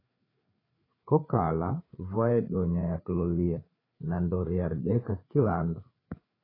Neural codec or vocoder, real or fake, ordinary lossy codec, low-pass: codec, 16 kHz, 4 kbps, FreqCodec, larger model; fake; AAC, 24 kbps; 5.4 kHz